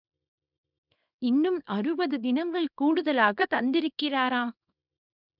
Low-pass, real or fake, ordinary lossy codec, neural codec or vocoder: 5.4 kHz; fake; none; codec, 24 kHz, 0.9 kbps, WavTokenizer, small release